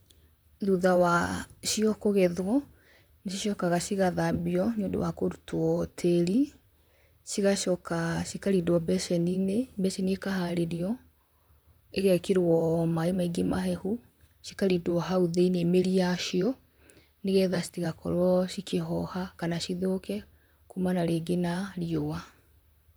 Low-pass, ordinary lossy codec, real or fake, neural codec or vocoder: none; none; fake; vocoder, 44.1 kHz, 128 mel bands, Pupu-Vocoder